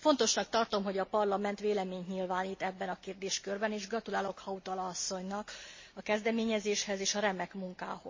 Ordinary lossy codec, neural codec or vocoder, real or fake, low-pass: MP3, 32 kbps; none; real; 7.2 kHz